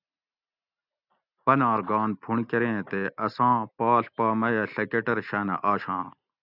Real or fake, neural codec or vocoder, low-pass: real; none; 5.4 kHz